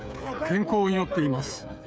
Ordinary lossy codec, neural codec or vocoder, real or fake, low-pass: none; codec, 16 kHz, 8 kbps, FreqCodec, smaller model; fake; none